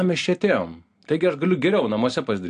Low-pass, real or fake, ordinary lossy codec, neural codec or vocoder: 9.9 kHz; real; AAC, 64 kbps; none